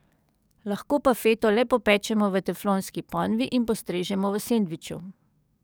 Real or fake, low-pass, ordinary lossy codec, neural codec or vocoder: fake; none; none; codec, 44.1 kHz, 7.8 kbps, DAC